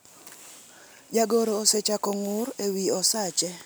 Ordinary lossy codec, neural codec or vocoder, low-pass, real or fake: none; none; none; real